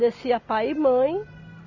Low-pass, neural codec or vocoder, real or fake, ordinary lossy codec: 7.2 kHz; none; real; Opus, 64 kbps